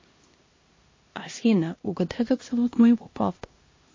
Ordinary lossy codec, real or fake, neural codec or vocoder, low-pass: MP3, 32 kbps; fake; codec, 16 kHz, 1 kbps, X-Codec, HuBERT features, trained on LibriSpeech; 7.2 kHz